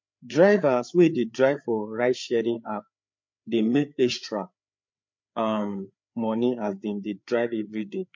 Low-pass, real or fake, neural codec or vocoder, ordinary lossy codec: 7.2 kHz; fake; codec, 16 kHz, 4 kbps, FreqCodec, larger model; MP3, 48 kbps